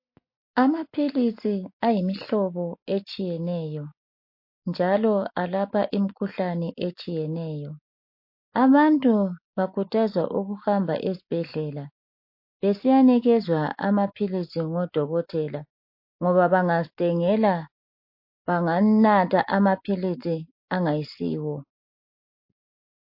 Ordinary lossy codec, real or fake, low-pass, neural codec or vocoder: MP3, 32 kbps; real; 5.4 kHz; none